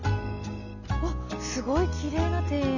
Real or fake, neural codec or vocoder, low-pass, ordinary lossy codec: real; none; 7.2 kHz; none